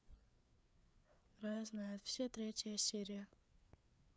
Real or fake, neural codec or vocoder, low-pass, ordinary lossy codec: fake; codec, 16 kHz, 4 kbps, FreqCodec, larger model; none; none